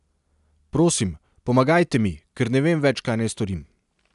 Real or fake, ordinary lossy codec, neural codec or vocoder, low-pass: real; none; none; 10.8 kHz